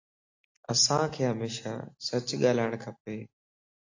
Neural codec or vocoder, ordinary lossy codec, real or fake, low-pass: none; AAC, 48 kbps; real; 7.2 kHz